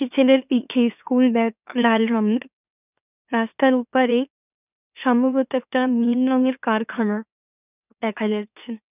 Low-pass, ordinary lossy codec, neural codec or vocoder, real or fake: 3.6 kHz; none; autoencoder, 44.1 kHz, a latent of 192 numbers a frame, MeloTTS; fake